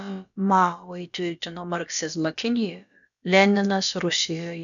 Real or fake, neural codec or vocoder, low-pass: fake; codec, 16 kHz, about 1 kbps, DyCAST, with the encoder's durations; 7.2 kHz